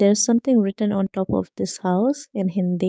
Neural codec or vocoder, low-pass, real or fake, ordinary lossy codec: codec, 16 kHz, 6 kbps, DAC; none; fake; none